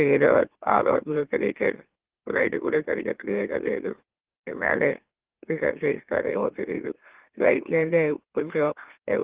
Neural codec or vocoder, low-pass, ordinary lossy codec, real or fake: autoencoder, 44.1 kHz, a latent of 192 numbers a frame, MeloTTS; 3.6 kHz; Opus, 16 kbps; fake